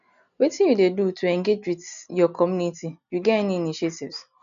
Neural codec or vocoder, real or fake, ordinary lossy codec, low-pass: none; real; none; 7.2 kHz